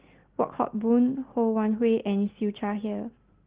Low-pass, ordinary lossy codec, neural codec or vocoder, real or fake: 3.6 kHz; Opus, 16 kbps; none; real